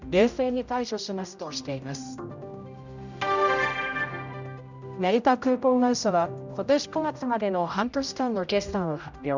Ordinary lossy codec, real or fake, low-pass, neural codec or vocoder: none; fake; 7.2 kHz; codec, 16 kHz, 0.5 kbps, X-Codec, HuBERT features, trained on general audio